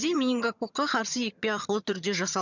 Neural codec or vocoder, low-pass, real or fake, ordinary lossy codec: vocoder, 22.05 kHz, 80 mel bands, HiFi-GAN; 7.2 kHz; fake; none